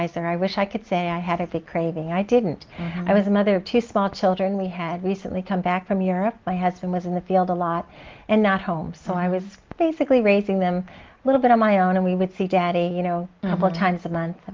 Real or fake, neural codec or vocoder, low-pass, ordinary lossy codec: real; none; 7.2 kHz; Opus, 32 kbps